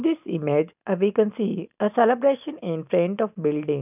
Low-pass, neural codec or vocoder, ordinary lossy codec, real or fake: 3.6 kHz; none; none; real